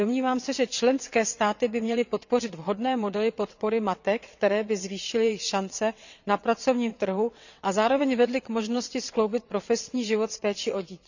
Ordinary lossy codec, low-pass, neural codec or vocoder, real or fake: none; 7.2 kHz; vocoder, 22.05 kHz, 80 mel bands, WaveNeXt; fake